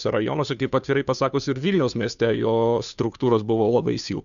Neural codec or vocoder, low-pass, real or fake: codec, 16 kHz, 2 kbps, FunCodec, trained on Chinese and English, 25 frames a second; 7.2 kHz; fake